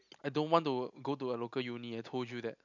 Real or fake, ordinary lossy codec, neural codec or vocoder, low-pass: real; none; none; 7.2 kHz